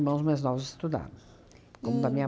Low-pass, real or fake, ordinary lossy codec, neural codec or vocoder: none; real; none; none